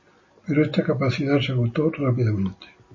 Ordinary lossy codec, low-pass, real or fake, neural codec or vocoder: MP3, 32 kbps; 7.2 kHz; real; none